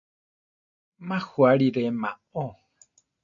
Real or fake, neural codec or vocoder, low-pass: real; none; 7.2 kHz